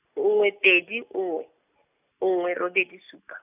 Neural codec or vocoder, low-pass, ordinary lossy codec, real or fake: none; 3.6 kHz; none; real